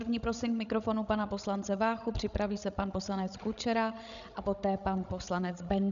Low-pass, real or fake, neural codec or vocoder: 7.2 kHz; fake; codec, 16 kHz, 16 kbps, FreqCodec, larger model